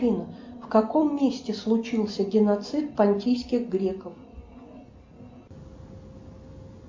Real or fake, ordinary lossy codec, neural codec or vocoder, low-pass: real; MP3, 48 kbps; none; 7.2 kHz